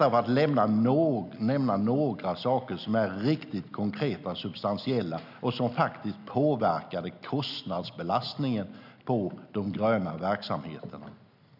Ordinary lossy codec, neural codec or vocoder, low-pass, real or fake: none; none; 5.4 kHz; real